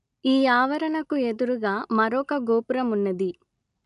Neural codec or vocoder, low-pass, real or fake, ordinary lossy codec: none; 10.8 kHz; real; none